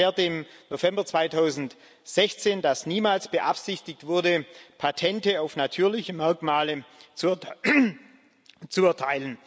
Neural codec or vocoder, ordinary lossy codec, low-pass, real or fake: none; none; none; real